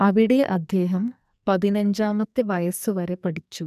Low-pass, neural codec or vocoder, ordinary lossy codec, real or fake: 14.4 kHz; codec, 44.1 kHz, 2.6 kbps, SNAC; none; fake